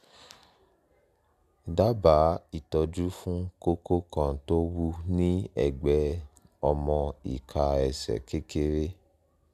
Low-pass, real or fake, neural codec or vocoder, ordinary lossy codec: 14.4 kHz; real; none; none